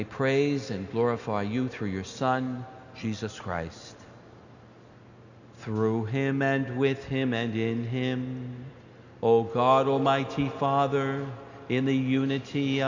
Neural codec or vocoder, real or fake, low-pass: none; real; 7.2 kHz